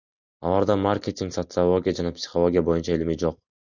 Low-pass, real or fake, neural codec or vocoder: 7.2 kHz; real; none